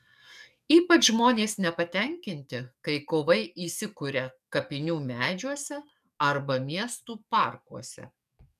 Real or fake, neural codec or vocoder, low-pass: fake; codec, 44.1 kHz, 7.8 kbps, DAC; 14.4 kHz